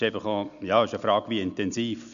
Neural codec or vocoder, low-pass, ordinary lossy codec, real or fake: none; 7.2 kHz; none; real